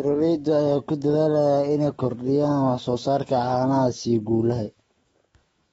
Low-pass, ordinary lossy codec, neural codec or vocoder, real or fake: 19.8 kHz; AAC, 24 kbps; autoencoder, 48 kHz, 128 numbers a frame, DAC-VAE, trained on Japanese speech; fake